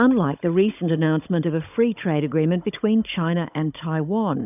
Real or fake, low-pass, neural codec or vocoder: fake; 3.6 kHz; codec, 16 kHz, 16 kbps, FunCodec, trained on Chinese and English, 50 frames a second